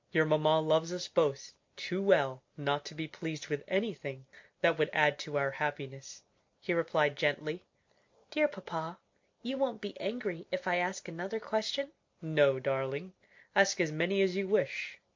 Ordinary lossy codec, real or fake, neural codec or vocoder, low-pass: MP3, 48 kbps; real; none; 7.2 kHz